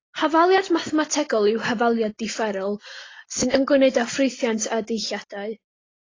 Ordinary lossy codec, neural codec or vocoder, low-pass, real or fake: AAC, 32 kbps; none; 7.2 kHz; real